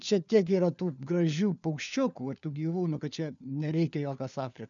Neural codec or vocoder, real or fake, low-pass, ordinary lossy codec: codec, 16 kHz, 4 kbps, FunCodec, trained on LibriTTS, 50 frames a second; fake; 7.2 kHz; MP3, 96 kbps